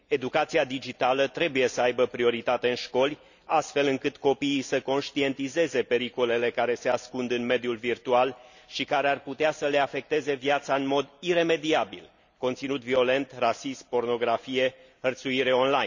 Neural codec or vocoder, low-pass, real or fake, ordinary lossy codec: none; 7.2 kHz; real; none